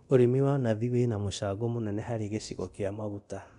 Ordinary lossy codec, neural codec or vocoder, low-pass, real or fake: none; codec, 24 kHz, 0.9 kbps, DualCodec; 10.8 kHz; fake